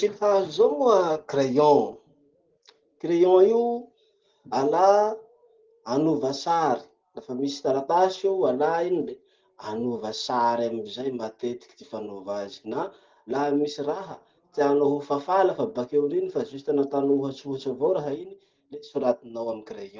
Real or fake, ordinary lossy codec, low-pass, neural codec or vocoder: real; Opus, 16 kbps; 7.2 kHz; none